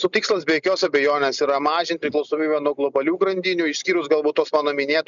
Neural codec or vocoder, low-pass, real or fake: none; 7.2 kHz; real